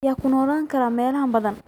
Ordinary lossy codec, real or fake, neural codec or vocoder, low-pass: none; real; none; 19.8 kHz